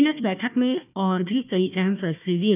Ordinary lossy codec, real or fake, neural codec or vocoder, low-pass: none; fake; codec, 16 kHz, 1 kbps, FunCodec, trained on Chinese and English, 50 frames a second; 3.6 kHz